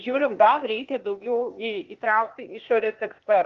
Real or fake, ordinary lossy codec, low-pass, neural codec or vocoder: fake; Opus, 32 kbps; 7.2 kHz; codec, 16 kHz, 0.8 kbps, ZipCodec